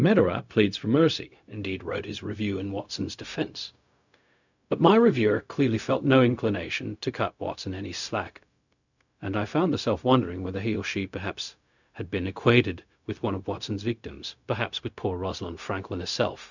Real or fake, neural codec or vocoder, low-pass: fake; codec, 16 kHz, 0.4 kbps, LongCat-Audio-Codec; 7.2 kHz